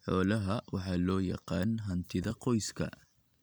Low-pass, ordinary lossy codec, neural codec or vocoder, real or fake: none; none; none; real